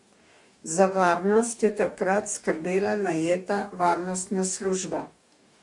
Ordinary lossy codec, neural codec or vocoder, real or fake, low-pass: MP3, 64 kbps; codec, 44.1 kHz, 2.6 kbps, DAC; fake; 10.8 kHz